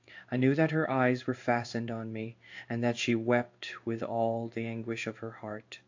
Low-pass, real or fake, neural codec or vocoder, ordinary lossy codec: 7.2 kHz; fake; codec, 16 kHz in and 24 kHz out, 1 kbps, XY-Tokenizer; AAC, 48 kbps